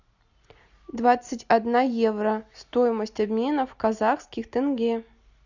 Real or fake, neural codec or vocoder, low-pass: real; none; 7.2 kHz